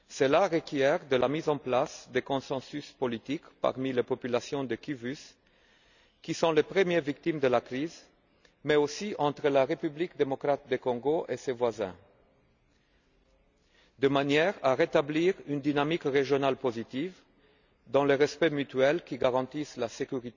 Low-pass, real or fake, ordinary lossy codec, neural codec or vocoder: 7.2 kHz; real; none; none